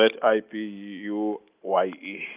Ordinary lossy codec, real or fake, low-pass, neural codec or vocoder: Opus, 24 kbps; real; 3.6 kHz; none